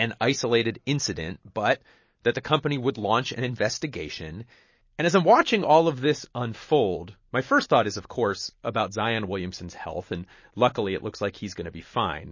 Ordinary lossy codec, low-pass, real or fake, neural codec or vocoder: MP3, 32 kbps; 7.2 kHz; real; none